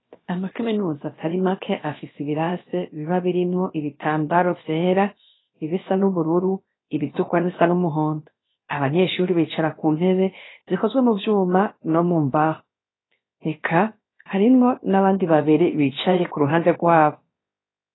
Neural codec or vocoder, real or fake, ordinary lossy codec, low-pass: codec, 16 kHz, about 1 kbps, DyCAST, with the encoder's durations; fake; AAC, 16 kbps; 7.2 kHz